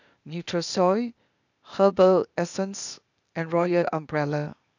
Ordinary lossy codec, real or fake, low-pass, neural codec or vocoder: none; fake; 7.2 kHz; codec, 16 kHz, 0.8 kbps, ZipCodec